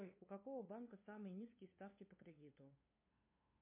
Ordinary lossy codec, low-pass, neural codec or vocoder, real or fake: MP3, 24 kbps; 3.6 kHz; codec, 16 kHz, 2 kbps, FunCodec, trained on LibriTTS, 25 frames a second; fake